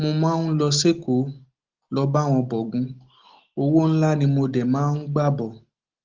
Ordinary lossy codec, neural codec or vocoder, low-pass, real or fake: Opus, 16 kbps; none; 7.2 kHz; real